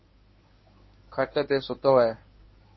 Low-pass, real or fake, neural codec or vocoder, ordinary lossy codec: 7.2 kHz; fake; codec, 24 kHz, 0.9 kbps, WavTokenizer, medium speech release version 1; MP3, 24 kbps